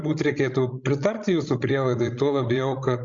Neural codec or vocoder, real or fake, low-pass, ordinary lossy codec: codec, 16 kHz, 16 kbps, FreqCodec, larger model; fake; 7.2 kHz; Opus, 64 kbps